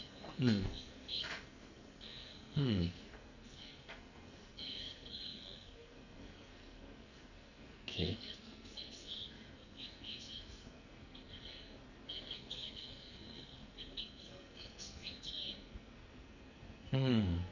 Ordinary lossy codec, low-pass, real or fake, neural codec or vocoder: none; 7.2 kHz; fake; codec, 44.1 kHz, 2.6 kbps, SNAC